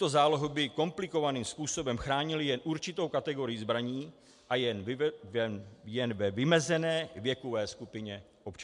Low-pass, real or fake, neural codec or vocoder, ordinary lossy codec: 10.8 kHz; real; none; MP3, 64 kbps